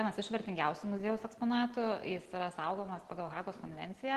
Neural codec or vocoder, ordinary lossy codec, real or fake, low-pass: none; Opus, 16 kbps; real; 14.4 kHz